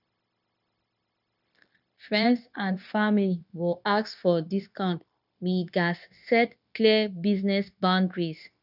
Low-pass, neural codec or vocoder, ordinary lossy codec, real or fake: 5.4 kHz; codec, 16 kHz, 0.9 kbps, LongCat-Audio-Codec; none; fake